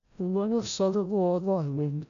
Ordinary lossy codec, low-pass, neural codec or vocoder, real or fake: MP3, 96 kbps; 7.2 kHz; codec, 16 kHz, 0.5 kbps, FreqCodec, larger model; fake